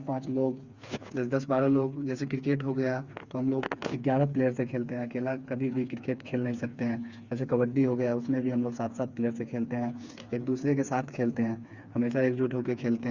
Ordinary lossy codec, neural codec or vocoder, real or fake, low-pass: Opus, 64 kbps; codec, 16 kHz, 4 kbps, FreqCodec, smaller model; fake; 7.2 kHz